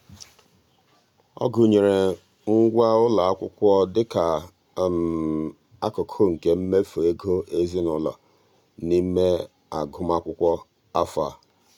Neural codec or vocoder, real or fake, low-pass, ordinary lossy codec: none; real; 19.8 kHz; none